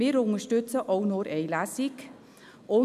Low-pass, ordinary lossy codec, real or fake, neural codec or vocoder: 14.4 kHz; none; real; none